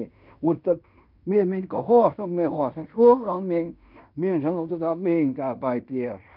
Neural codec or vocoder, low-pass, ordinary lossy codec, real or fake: codec, 16 kHz in and 24 kHz out, 0.9 kbps, LongCat-Audio-Codec, fine tuned four codebook decoder; 5.4 kHz; none; fake